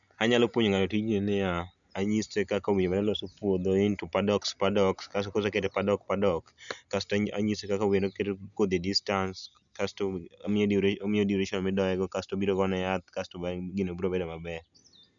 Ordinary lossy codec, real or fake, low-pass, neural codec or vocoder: none; real; 7.2 kHz; none